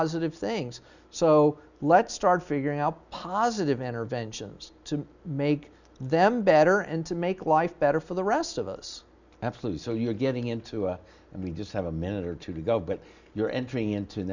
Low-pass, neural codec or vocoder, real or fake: 7.2 kHz; none; real